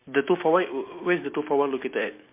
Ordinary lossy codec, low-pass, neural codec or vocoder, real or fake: MP3, 24 kbps; 3.6 kHz; none; real